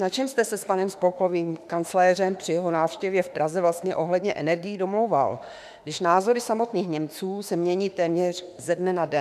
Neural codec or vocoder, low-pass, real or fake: autoencoder, 48 kHz, 32 numbers a frame, DAC-VAE, trained on Japanese speech; 14.4 kHz; fake